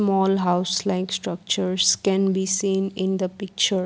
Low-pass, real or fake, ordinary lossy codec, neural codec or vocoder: none; real; none; none